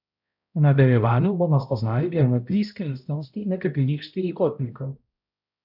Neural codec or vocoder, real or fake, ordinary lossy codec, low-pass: codec, 16 kHz, 0.5 kbps, X-Codec, HuBERT features, trained on balanced general audio; fake; none; 5.4 kHz